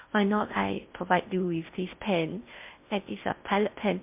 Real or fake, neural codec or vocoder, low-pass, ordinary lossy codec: fake; codec, 16 kHz in and 24 kHz out, 0.6 kbps, FocalCodec, streaming, 4096 codes; 3.6 kHz; MP3, 32 kbps